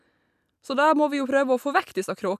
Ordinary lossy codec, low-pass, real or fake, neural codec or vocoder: none; 14.4 kHz; real; none